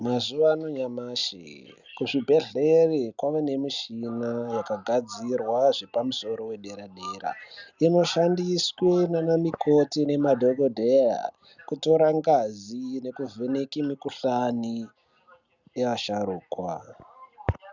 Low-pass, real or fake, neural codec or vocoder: 7.2 kHz; real; none